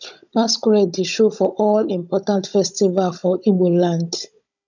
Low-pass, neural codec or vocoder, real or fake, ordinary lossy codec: 7.2 kHz; codec, 16 kHz, 16 kbps, FunCodec, trained on Chinese and English, 50 frames a second; fake; none